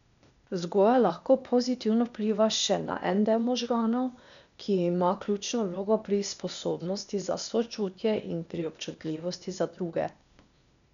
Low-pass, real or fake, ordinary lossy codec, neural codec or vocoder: 7.2 kHz; fake; none; codec, 16 kHz, 0.8 kbps, ZipCodec